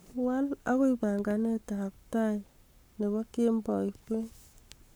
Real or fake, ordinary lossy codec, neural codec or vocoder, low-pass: fake; none; codec, 44.1 kHz, 7.8 kbps, Pupu-Codec; none